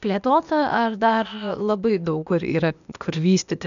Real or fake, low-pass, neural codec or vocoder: fake; 7.2 kHz; codec, 16 kHz, 0.8 kbps, ZipCodec